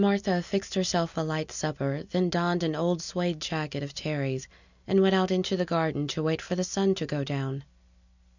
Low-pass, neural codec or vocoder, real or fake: 7.2 kHz; none; real